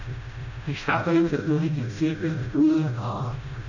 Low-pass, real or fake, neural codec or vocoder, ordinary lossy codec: 7.2 kHz; fake; codec, 16 kHz, 0.5 kbps, FreqCodec, smaller model; none